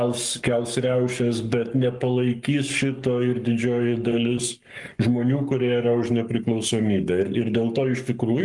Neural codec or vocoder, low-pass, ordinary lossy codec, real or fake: codec, 44.1 kHz, 7.8 kbps, Pupu-Codec; 10.8 kHz; Opus, 32 kbps; fake